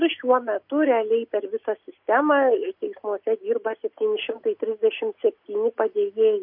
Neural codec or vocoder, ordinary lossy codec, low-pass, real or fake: none; MP3, 32 kbps; 5.4 kHz; real